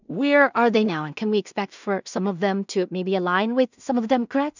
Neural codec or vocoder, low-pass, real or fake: codec, 16 kHz in and 24 kHz out, 0.4 kbps, LongCat-Audio-Codec, two codebook decoder; 7.2 kHz; fake